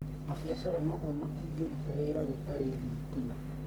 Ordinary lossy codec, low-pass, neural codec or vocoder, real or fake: none; none; codec, 44.1 kHz, 1.7 kbps, Pupu-Codec; fake